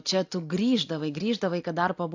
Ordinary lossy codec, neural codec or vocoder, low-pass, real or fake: AAC, 48 kbps; none; 7.2 kHz; real